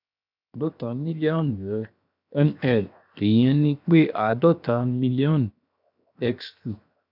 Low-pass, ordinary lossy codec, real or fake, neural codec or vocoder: 5.4 kHz; none; fake; codec, 16 kHz, 0.7 kbps, FocalCodec